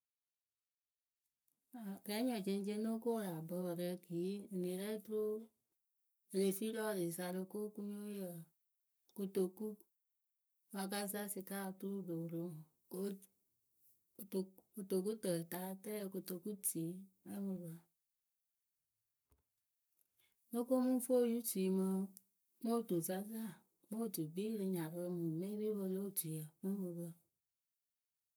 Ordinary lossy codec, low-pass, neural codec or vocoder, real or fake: none; none; codec, 44.1 kHz, 7.8 kbps, Pupu-Codec; fake